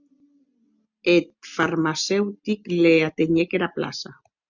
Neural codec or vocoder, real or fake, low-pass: none; real; 7.2 kHz